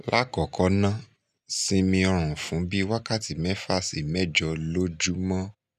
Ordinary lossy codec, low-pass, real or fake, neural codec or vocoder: none; 14.4 kHz; real; none